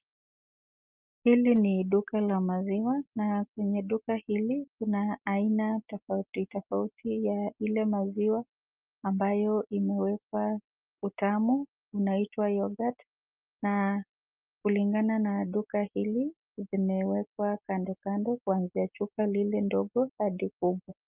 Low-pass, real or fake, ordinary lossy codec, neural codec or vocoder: 3.6 kHz; real; Opus, 24 kbps; none